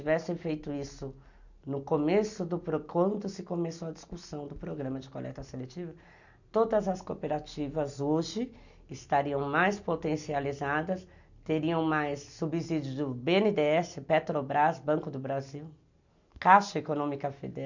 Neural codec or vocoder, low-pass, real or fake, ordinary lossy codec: none; 7.2 kHz; real; none